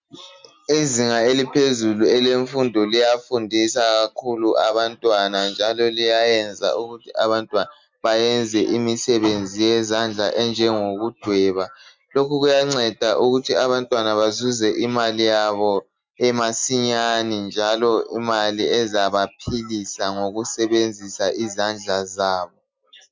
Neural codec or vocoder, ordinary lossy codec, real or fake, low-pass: none; MP3, 64 kbps; real; 7.2 kHz